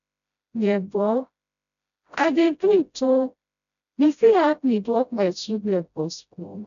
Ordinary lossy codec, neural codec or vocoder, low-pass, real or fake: none; codec, 16 kHz, 0.5 kbps, FreqCodec, smaller model; 7.2 kHz; fake